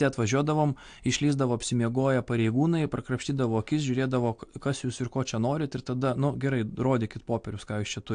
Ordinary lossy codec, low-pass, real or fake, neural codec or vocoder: AAC, 64 kbps; 9.9 kHz; real; none